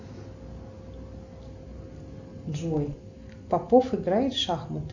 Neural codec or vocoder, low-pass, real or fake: none; 7.2 kHz; real